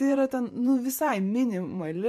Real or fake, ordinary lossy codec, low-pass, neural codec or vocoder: fake; MP3, 64 kbps; 14.4 kHz; vocoder, 44.1 kHz, 128 mel bands every 512 samples, BigVGAN v2